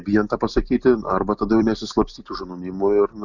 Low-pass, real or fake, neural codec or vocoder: 7.2 kHz; real; none